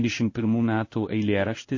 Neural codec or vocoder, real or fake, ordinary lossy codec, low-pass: codec, 16 kHz in and 24 kHz out, 1 kbps, XY-Tokenizer; fake; MP3, 32 kbps; 7.2 kHz